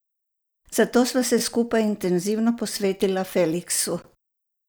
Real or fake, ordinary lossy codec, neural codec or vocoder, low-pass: real; none; none; none